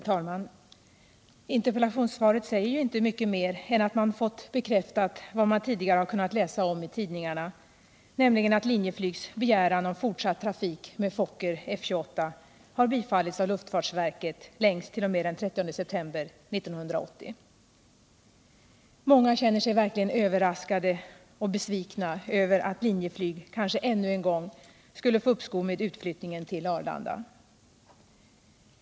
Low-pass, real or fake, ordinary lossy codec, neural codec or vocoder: none; real; none; none